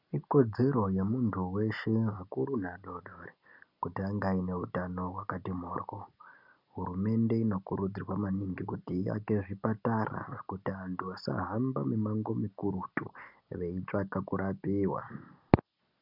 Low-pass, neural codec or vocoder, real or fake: 5.4 kHz; none; real